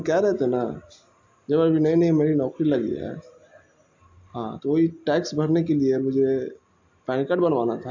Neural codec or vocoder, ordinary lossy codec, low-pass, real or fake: none; AAC, 48 kbps; 7.2 kHz; real